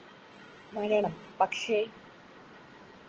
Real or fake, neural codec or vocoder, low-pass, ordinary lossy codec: real; none; 7.2 kHz; Opus, 16 kbps